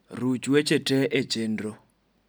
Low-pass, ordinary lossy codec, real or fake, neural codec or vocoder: none; none; real; none